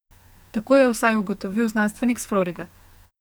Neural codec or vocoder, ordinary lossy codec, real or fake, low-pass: codec, 44.1 kHz, 2.6 kbps, SNAC; none; fake; none